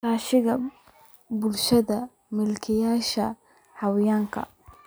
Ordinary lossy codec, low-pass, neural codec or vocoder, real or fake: none; none; none; real